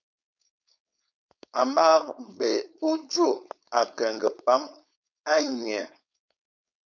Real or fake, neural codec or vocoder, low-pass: fake; codec, 16 kHz, 4.8 kbps, FACodec; 7.2 kHz